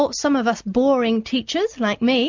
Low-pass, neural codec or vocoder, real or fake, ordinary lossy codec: 7.2 kHz; none; real; MP3, 64 kbps